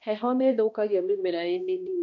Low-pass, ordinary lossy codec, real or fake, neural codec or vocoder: 7.2 kHz; none; fake; codec, 16 kHz, 1 kbps, X-Codec, HuBERT features, trained on balanced general audio